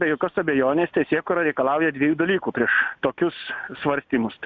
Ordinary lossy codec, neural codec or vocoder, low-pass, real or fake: Opus, 64 kbps; none; 7.2 kHz; real